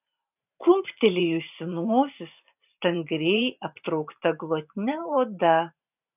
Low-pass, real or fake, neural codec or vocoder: 3.6 kHz; fake; vocoder, 44.1 kHz, 128 mel bands every 512 samples, BigVGAN v2